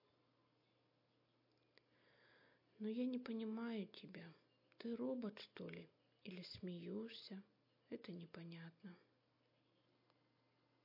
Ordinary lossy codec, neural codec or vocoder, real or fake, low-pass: MP3, 32 kbps; none; real; 5.4 kHz